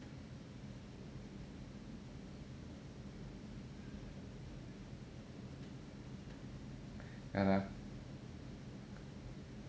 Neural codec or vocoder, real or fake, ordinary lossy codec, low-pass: none; real; none; none